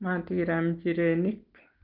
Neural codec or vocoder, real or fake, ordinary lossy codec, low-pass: none; real; Opus, 32 kbps; 5.4 kHz